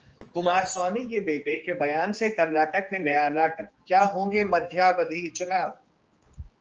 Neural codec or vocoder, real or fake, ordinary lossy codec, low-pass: codec, 16 kHz, 2 kbps, X-Codec, HuBERT features, trained on general audio; fake; Opus, 24 kbps; 7.2 kHz